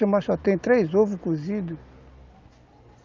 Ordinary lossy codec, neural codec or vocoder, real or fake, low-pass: Opus, 32 kbps; none; real; 7.2 kHz